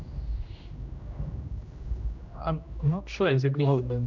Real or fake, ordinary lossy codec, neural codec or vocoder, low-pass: fake; MP3, 64 kbps; codec, 16 kHz, 1 kbps, X-Codec, HuBERT features, trained on general audio; 7.2 kHz